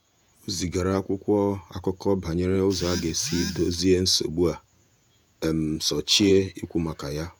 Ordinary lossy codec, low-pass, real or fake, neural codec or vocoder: none; none; fake; vocoder, 48 kHz, 128 mel bands, Vocos